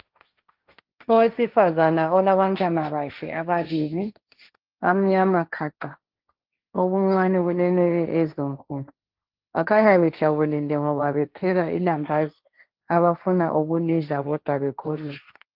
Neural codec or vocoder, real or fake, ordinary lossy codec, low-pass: codec, 16 kHz, 1.1 kbps, Voila-Tokenizer; fake; Opus, 24 kbps; 5.4 kHz